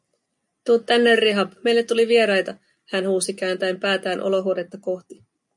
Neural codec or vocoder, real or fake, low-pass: none; real; 10.8 kHz